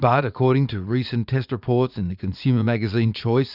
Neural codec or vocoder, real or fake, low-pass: vocoder, 44.1 kHz, 80 mel bands, Vocos; fake; 5.4 kHz